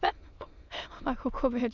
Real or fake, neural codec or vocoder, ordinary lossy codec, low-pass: fake; autoencoder, 22.05 kHz, a latent of 192 numbers a frame, VITS, trained on many speakers; none; 7.2 kHz